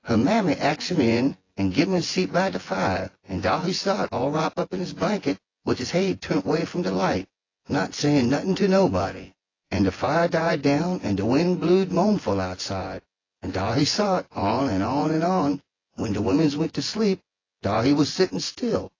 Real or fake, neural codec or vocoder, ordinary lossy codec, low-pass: fake; vocoder, 24 kHz, 100 mel bands, Vocos; AAC, 32 kbps; 7.2 kHz